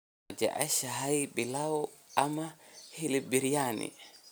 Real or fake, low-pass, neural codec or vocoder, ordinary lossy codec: real; none; none; none